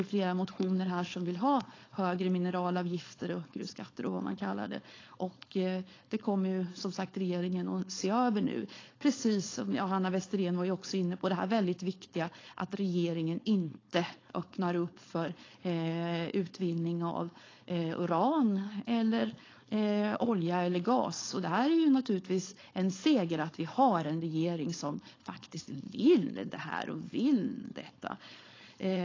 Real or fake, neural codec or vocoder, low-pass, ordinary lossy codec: fake; codec, 16 kHz, 4.8 kbps, FACodec; 7.2 kHz; AAC, 32 kbps